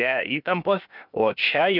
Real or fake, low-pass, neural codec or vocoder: fake; 5.4 kHz; codec, 16 kHz, 0.8 kbps, ZipCodec